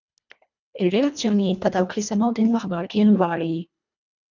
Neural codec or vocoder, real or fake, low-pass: codec, 24 kHz, 1.5 kbps, HILCodec; fake; 7.2 kHz